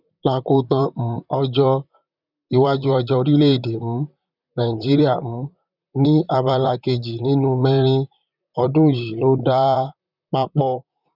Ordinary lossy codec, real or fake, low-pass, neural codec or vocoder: none; fake; 5.4 kHz; vocoder, 44.1 kHz, 128 mel bands, Pupu-Vocoder